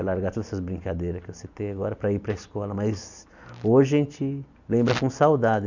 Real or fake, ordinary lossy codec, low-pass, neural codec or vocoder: real; none; 7.2 kHz; none